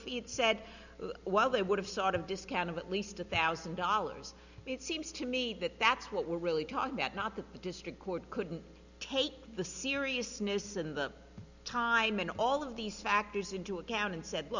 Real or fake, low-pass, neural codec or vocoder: real; 7.2 kHz; none